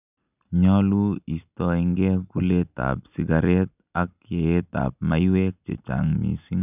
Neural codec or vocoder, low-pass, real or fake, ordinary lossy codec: none; 3.6 kHz; real; none